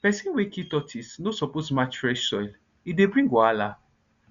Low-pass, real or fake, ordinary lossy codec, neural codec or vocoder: 7.2 kHz; real; Opus, 64 kbps; none